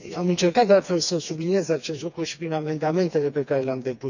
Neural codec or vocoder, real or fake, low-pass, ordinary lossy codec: codec, 16 kHz, 2 kbps, FreqCodec, smaller model; fake; 7.2 kHz; none